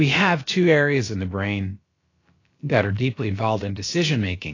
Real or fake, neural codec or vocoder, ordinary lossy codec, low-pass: fake; codec, 16 kHz, about 1 kbps, DyCAST, with the encoder's durations; AAC, 32 kbps; 7.2 kHz